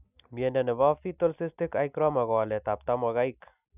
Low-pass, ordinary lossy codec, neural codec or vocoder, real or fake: 3.6 kHz; none; none; real